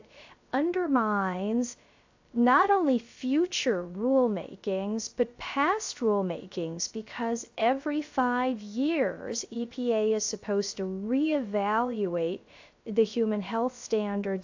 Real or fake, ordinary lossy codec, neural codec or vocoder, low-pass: fake; AAC, 48 kbps; codec, 16 kHz, 0.3 kbps, FocalCodec; 7.2 kHz